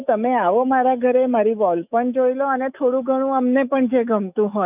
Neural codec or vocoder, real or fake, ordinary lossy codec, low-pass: autoencoder, 48 kHz, 128 numbers a frame, DAC-VAE, trained on Japanese speech; fake; none; 3.6 kHz